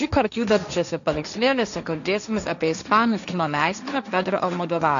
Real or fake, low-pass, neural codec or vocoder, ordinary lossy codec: fake; 7.2 kHz; codec, 16 kHz, 1.1 kbps, Voila-Tokenizer; MP3, 96 kbps